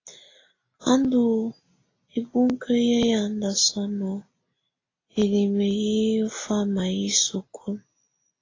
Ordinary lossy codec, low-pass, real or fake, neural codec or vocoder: AAC, 32 kbps; 7.2 kHz; real; none